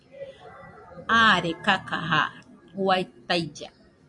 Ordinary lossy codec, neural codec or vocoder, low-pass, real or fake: MP3, 64 kbps; none; 10.8 kHz; real